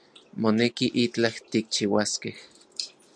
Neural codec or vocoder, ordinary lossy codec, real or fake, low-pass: none; MP3, 96 kbps; real; 9.9 kHz